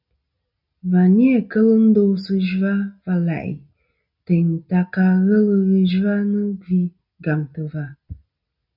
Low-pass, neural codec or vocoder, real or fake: 5.4 kHz; none; real